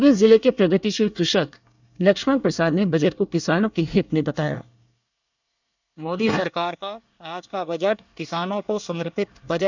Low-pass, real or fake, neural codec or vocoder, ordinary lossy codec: 7.2 kHz; fake; codec, 24 kHz, 1 kbps, SNAC; none